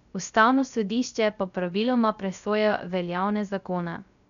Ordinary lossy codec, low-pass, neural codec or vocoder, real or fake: none; 7.2 kHz; codec, 16 kHz, 0.3 kbps, FocalCodec; fake